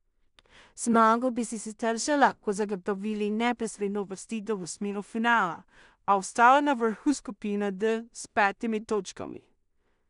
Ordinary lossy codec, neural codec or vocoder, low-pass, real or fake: none; codec, 16 kHz in and 24 kHz out, 0.4 kbps, LongCat-Audio-Codec, two codebook decoder; 10.8 kHz; fake